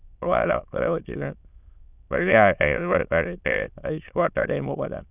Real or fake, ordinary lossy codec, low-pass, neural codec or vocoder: fake; none; 3.6 kHz; autoencoder, 22.05 kHz, a latent of 192 numbers a frame, VITS, trained on many speakers